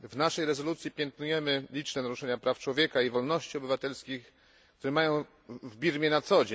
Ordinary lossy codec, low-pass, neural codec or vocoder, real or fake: none; none; none; real